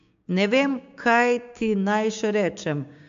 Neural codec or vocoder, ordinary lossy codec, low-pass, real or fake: codec, 16 kHz, 6 kbps, DAC; AAC, 64 kbps; 7.2 kHz; fake